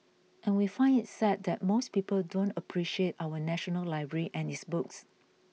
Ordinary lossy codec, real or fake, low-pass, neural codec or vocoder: none; real; none; none